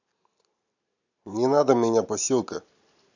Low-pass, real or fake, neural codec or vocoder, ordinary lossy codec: 7.2 kHz; real; none; none